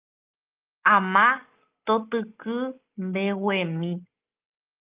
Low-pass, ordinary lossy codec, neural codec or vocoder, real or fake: 3.6 kHz; Opus, 24 kbps; none; real